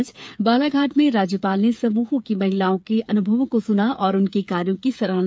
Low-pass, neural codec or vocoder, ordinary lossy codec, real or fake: none; codec, 16 kHz, 8 kbps, FreqCodec, smaller model; none; fake